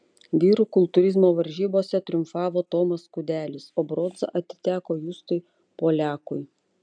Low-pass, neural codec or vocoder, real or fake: 9.9 kHz; none; real